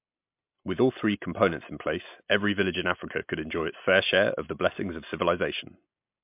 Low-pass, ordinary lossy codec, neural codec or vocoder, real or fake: 3.6 kHz; MP3, 32 kbps; none; real